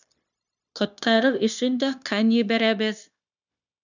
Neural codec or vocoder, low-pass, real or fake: codec, 16 kHz, 0.9 kbps, LongCat-Audio-Codec; 7.2 kHz; fake